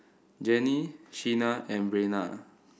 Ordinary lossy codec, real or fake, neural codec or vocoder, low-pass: none; real; none; none